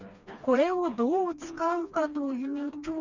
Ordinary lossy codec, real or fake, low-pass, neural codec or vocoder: none; fake; 7.2 kHz; codec, 16 kHz, 2 kbps, FreqCodec, smaller model